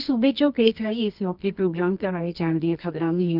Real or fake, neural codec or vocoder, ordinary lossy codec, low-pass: fake; codec, 24 kHz, 0.9 kbps, WavTokenizer, medium music audio release; none; 5.4 kHz